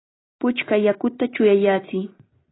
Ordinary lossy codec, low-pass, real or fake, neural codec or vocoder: AAC, 16 kbps; 7.2 kHz; real; none